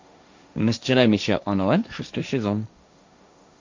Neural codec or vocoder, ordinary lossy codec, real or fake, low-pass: codec, 16 kHz, 1.1 kbps, Voila-Tokenizer; MP3, 64 kbps; fake; 7.2 kHz